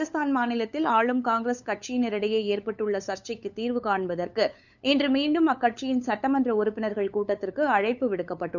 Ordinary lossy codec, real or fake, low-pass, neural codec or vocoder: none; fake; 7.2 kHz; codec, 16 kHz, 8 kbps, FunCodec, trained on LibriTTS, 25 frames a second